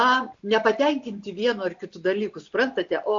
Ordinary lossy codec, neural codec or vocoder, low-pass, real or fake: AAC, 64 kbps; none; 7.2 kHz; real